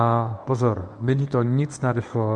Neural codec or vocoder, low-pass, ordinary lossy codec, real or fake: codec, 24 kHz, 0.9 kbps, WavTokenizer, medium speech release version 1; 10.8 kHz; MP3, 96 kbps; fake